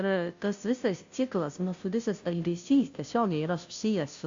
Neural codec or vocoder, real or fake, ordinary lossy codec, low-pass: codec, 16 kHz, 0.5 kbps, FunCodec, trained on Chinese and English, 25 frames a second; fake; AAC, 64 kbps; 7.2 kHz